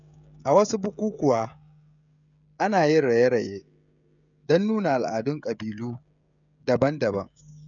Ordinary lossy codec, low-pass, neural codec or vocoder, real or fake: none; 7.2 kHz; codec, 16 kHz, 16 kbps, FreqCodec, smaller model; fake